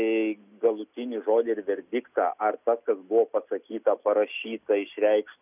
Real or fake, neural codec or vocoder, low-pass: real; none; 3.6 kHz